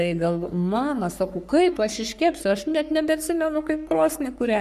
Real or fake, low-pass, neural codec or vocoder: fake; 14.4 kHz; codec, 44.1 kHz, 3.4 kbps, Pupu-Codec